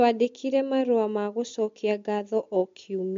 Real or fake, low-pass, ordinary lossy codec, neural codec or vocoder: real; 7.2 kHz; MP3, 48 kbps; none